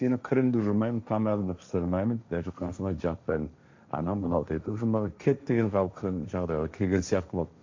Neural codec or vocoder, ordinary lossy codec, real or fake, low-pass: codec, 16 kHz, 1.1 kbps, Voila-Tokenizer; MP3, 64 kbps; fake; 7.2 kHz